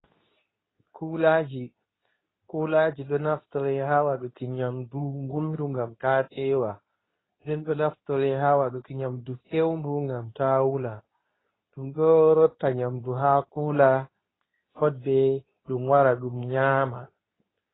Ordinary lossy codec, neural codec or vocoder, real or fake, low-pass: AAC, 16 kbps; codec, 24 kHz, 0.9 kbps, WavTokenizer, medium speech release version 2; fake; 7.2 kHz